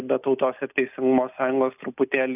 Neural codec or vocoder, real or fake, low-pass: none; real; 3.6 kHz